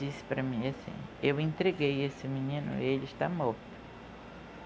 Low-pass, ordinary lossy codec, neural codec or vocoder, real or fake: none; none; none; real